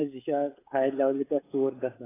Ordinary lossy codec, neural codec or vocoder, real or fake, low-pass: AAC, 16 kbps; codec, 16 kHz, 16 kbps, FreqCodec, smaller model; fake; 3.6 kHz